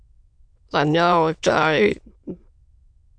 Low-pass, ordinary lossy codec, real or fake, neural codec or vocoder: 9.9 kHz; MP3, 64 kbps; fake; autoencoder, 22.05 kHz, a latent of 192 numbers a frame, VITS, trained on many speakers